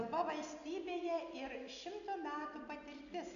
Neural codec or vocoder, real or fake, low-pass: none; real; 7.2 kHz